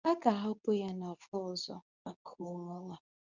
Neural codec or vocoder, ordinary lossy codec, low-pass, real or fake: codec, 24 kHz, 0.9 kbps, WavTokenizer, medium speech release version 2; none; 7.2 kHz; fake